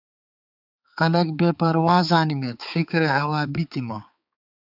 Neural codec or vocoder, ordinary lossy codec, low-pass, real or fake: codec, 16 kHz, 4 kbps, X-Codec, HuBERT features, trained on balanced general audio; AAC, 48 kbps; 5.4 kHz; fake